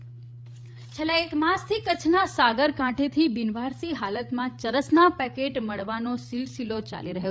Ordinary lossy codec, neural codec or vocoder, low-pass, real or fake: none; codec, 16 kHz, 8 kbps, FreqCodec, larger model; none; fake